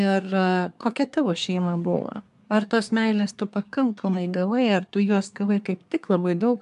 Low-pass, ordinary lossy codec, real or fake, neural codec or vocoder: 10.8 kHz; AAC, 96 kbps; fake; codec, 24 kHz, 1 kbps, SNAC